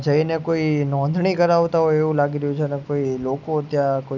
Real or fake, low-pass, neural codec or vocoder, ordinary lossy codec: real; 7.2 kHz; none; none